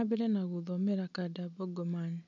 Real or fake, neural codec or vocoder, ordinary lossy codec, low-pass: real; none; none; 7.2 kHz